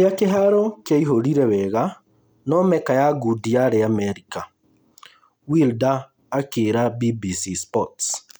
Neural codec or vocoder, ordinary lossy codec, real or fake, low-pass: none; none; real; none